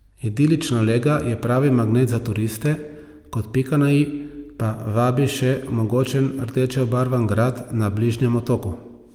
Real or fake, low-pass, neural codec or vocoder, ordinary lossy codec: real; 19.8 kHz; none; Opus, 32 kbps